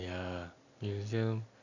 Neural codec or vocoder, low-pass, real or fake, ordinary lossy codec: none; 7.2 kHz; real; none